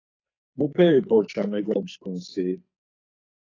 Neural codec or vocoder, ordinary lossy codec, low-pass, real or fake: codec, 44.1 kHz, 2.6 kbps, SNAC; AAC, 32 kbps; 7.2 kHz; fake